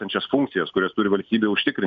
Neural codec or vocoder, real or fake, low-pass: none; real; 7.2 kHz